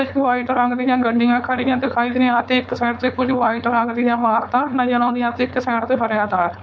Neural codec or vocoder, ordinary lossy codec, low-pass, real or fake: codec, 16 kHz, 4.8 kbps, FACodec; none; none; fake